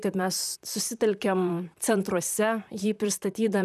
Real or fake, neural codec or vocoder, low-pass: fake; vocoder, 44.1 kHz, 128 mel bands, Pupu-Vocoder; 14.4 kHz